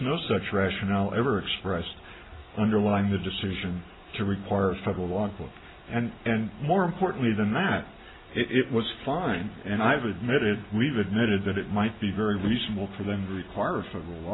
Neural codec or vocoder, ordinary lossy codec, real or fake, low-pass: none; AAC, 16 kbps; real; 7.2 kHz